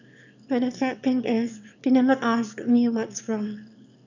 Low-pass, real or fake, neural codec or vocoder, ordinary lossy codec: 7.2 kHz; fake; autoencoder, 22.05 kHz, a latent of 192 numbers a frame, VITS, trained on one speaker; none